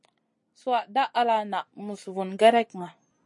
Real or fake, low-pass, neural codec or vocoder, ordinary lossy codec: real; 10.8 kHz; none; MP3, 48 kbps